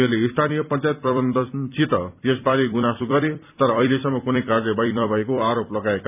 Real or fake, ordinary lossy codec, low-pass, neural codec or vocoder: fake; none; 3.6 kHz; vocoder, 44.1 kHz, 128 mel bands every 256 samples, BigVGAN v2